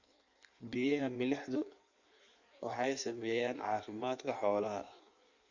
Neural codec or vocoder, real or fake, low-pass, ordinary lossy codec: codec, 16 kHz in and 24 kHz out, 1.1 kbps, FireRedTTS-2 codec; fake; 7.2 kHz; Opus, 64 kbps